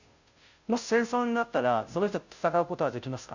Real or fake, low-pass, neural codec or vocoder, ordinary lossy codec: fake; 7.2 kHz; codec, 16 kHz, 0.5 kbps, FunCodec, trained on Chinese and English, 25 frames a second; none